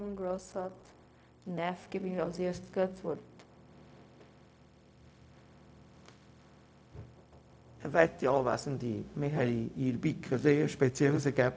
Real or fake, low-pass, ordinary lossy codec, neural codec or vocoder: fake; none; none; codec, 16 kHz, 0.4 kbps, LongCat-Audio-Codec